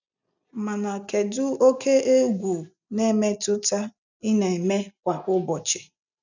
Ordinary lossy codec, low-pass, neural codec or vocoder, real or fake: none; 7.2 kHz; none; real